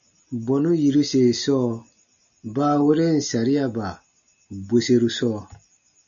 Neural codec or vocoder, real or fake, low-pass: none; real; 7.2 kHz